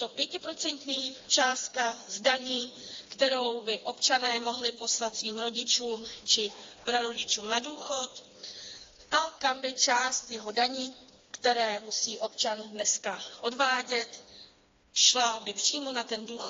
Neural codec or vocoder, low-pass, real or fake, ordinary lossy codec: codec, 16 kHz, 2 kbps, FreqCodec, smaller model; 7.2 kHz; fake; AAC, 32 kbps